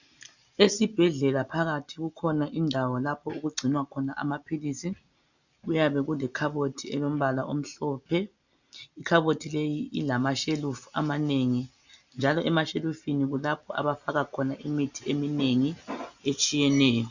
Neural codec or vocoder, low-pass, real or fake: none; 7.2 kHz; real